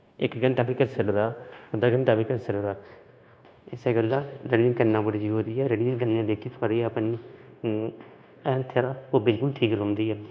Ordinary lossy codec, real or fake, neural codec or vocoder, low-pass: none; fake; codec, 16 kHz, 0.9 kbps, LongCat-Audio-Codec; none